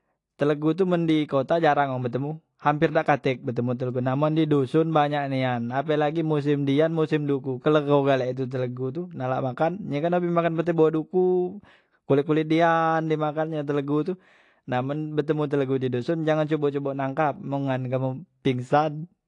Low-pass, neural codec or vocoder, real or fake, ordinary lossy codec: 10.8 kHz; none; real; AAC, 48 kbps